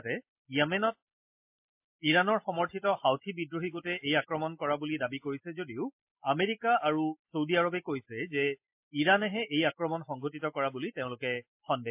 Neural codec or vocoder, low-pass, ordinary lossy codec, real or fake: none; 3.6 kHz; MP3, 32 kbps; real